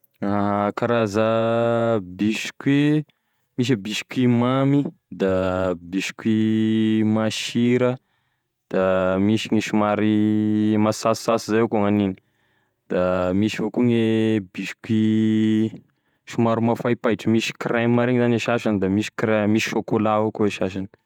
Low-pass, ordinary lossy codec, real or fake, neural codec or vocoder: 19.8 kHz; none; fake; vocoder, 48 kHz, 128 mel bands, Vocos